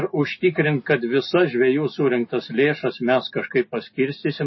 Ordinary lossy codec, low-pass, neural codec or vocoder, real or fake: MP3, 24 kbps; 7.2 kHz; none; real